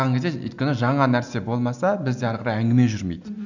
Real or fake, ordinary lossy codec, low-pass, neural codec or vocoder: real; none; 7.2 kHz; none